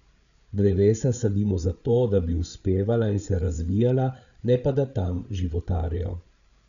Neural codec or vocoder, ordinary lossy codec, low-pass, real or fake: codec, 16 kHz, 8 kbps, FreqCodec, larger model; none; 7.2 kHz; fake